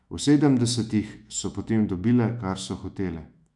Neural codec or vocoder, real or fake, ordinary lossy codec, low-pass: autoencoder, 48 kHz, 128 numbers a frame, DAC-VAE, trained on Japanese speech; fake; none; 10.8 kHz